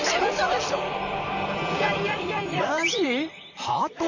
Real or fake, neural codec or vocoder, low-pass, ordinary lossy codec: fake; vocoder, 44.1 kHz, 128 mel bands, Pupu-Vocoder; 7.2 kHz; none